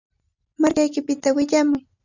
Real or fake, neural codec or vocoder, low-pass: real; none; 7.2 kHz